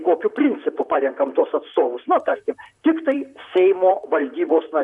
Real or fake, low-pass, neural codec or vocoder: fake; 10.8 kHz; vocoder, 44.1 kHz, 128 mel bands, Pupu-Vocoder